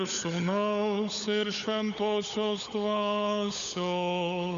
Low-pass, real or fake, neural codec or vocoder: 7.2 kHz; fake; codec, 16 kHz, 16 kbps, FunCodec, trained on LibriTTS, 50 frames a second